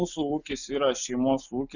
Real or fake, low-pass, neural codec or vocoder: real; 7.2 kHz; none